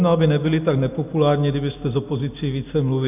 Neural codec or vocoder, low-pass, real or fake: none; 3.6 kHz; real